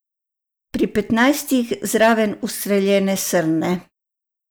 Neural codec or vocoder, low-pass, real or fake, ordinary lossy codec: none; none; real; none